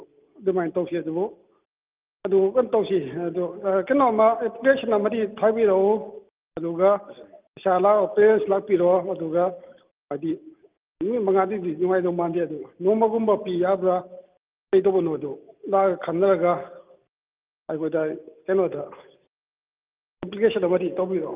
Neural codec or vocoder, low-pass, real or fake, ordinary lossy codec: none; 3.6 kHz; real; Opus, 32 kbps